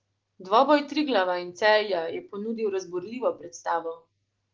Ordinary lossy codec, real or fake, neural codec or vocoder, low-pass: Opus, 16 kbps; real; none; 7.2 kHz